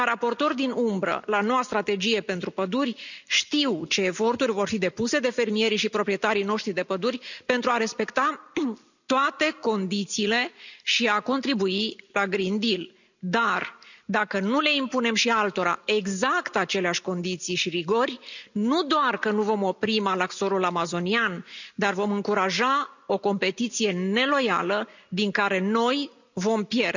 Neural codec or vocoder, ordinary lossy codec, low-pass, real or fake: none; none; 7.2 kHz; real